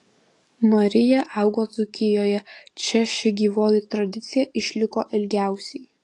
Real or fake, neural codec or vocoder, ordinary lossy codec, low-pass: fake; codec, 44.1 kHz, 7.8 kbps, DAC; AAC, 48 kbps; 10.8 kHz